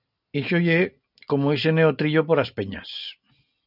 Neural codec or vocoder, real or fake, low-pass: none; real; 5.4 kHz